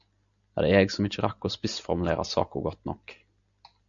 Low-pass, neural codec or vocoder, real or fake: 7.2 kHz; none; real